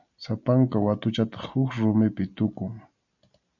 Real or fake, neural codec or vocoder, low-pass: real; none; 7.2 kHz